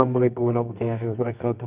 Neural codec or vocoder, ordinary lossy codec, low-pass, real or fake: codec, 24 kHz, 0.9 kbps, WavTokenizer, medium music audio release; Opus, 24 kbps; 3.6 kHz; fake